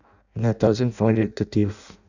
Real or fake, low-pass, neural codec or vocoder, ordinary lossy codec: fake; 7.2 kHz; codec, 16 kHz in and 24 kHz out, 0.6 kbps, FireRedTTS-2 codec; none